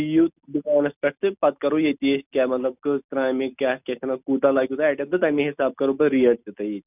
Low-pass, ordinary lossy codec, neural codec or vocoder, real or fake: 3.6 kHz; none; none; real